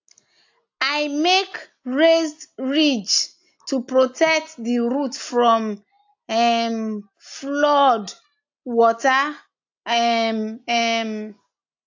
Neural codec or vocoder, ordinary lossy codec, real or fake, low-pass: none; AAC, 48 kbps; real; 7.2 kHz